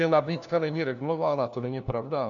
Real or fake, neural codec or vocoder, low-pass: fake; codec, 16 kHz, 1 kbps, FunCodec, trained on LibriTTS, 50 frames a second; 7.2 kHz